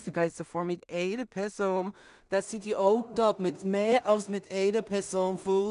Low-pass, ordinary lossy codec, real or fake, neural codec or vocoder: 10.8 kHz; none; fake; codec, 16 kHz in and 24 kHz out, 0.4 kbps, LongCat-Audio-Codec, two codebook decoder